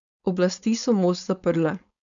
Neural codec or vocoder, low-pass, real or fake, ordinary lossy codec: codec, 16 kHz, 4.8 kbps, FACodec; 7.2 kHz; fake; none